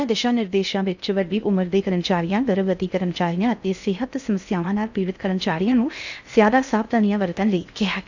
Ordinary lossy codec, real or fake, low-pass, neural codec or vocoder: none; fake; 7.2 kHz; codec, 16 kHz in and 24 kHz out, 0.6 kbps, FocalCodec, streaming, 2048 codes